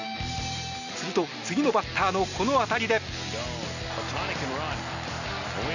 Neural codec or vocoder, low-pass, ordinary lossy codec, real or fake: none; 7.2 kHz; none; real